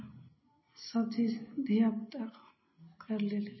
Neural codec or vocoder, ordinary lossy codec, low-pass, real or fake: none; MP3, 24 kbps; 7.2 kHz; real